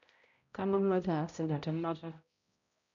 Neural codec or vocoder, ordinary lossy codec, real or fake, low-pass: codec, 16 kHz, 0.5 kbps, X-Codec, HuBERT features, trained on balanced general audio; none; fake; 7.2 kHz